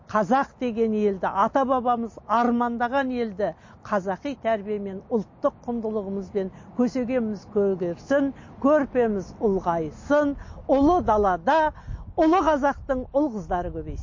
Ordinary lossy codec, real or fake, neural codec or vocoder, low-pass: MP3, 32 kbps; real; none; 7.2 kHz